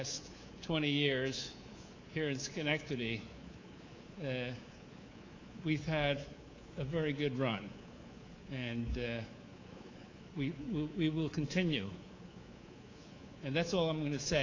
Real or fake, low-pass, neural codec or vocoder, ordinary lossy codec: fake; 7.2 kHz; codec, 24 kHz, 3.1 kbps, DualCodec; AAC, 32 kbps